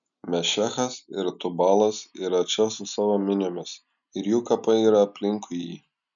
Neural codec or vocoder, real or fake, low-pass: none; real; 7.2 kHz